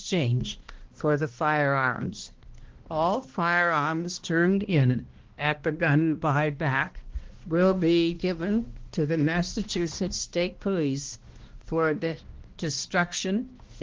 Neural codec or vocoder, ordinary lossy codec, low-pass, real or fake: codec, 16 kHz, 1 kbps, X-Codec, HuBERT features, trained on balanced general audio; Opus, 16 kbps; 7.2 kHz; fake